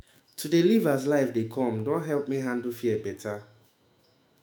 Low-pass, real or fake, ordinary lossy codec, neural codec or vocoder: none; fake; none; autoencoder, 48 kHz, 128 numbers a frame, DAC-VAE, trained on Japanese speech